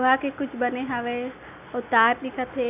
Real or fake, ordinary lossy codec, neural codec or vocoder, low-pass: real; none; none; 3.6 kHz